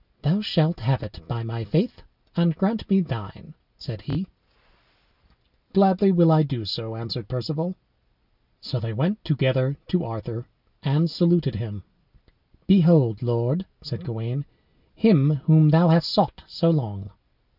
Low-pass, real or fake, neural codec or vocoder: 5.4 kHz; real; none